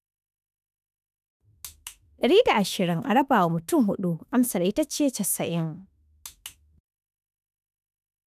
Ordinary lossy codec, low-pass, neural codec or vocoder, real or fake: none; 14.4 kHz; autoencoder, 48 kHz, 32 numbers a frame, DAC-VAE, trained on Japanese speech; fake